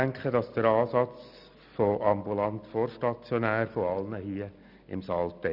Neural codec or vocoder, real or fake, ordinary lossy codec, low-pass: none; real; none; 5.4 kHz